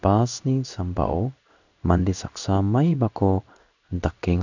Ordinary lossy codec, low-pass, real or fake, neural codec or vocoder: none; 7.2 kHz; fake; codec, 16 kHz in and 24 kHz out, 1 kbps, XY-Tokenizer